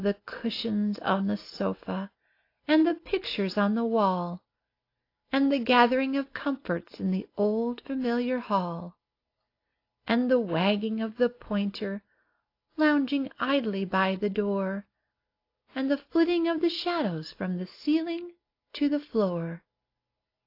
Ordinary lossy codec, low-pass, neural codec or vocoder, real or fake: AAC, 32 kbps; 5.4 kHz; none; real